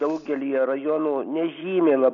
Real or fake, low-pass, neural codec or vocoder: real; 7.2 kHz; none